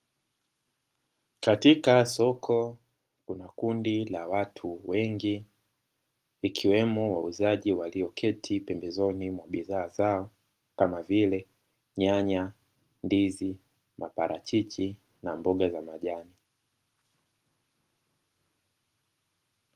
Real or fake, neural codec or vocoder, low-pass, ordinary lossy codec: real; none; 14.4 kHz; Opus, 24 kbps